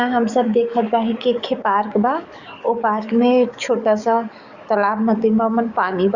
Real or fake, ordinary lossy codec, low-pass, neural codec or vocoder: fake; Opus, 64 kbps; 7.2 kHz; codec, 44.1 kHz, 7.8 kbps, DAC